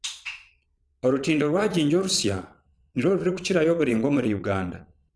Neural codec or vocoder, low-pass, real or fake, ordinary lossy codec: vocoder, 22.05 kHz, 80 mel bands, Vocos; none; fake; none